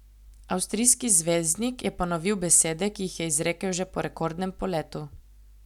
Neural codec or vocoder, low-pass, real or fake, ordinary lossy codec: none; 19.8 kHz; real; none